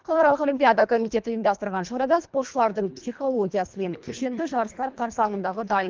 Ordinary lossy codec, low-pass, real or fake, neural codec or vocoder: Opus, 24 kbps; 7.2 kHz; fake; codec, 24 kHz, 1.5 kbps, HILCodec